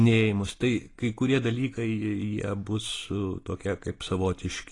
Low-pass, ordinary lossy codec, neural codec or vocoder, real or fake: 10.8 kHz; AAC, 32 kbps; none; real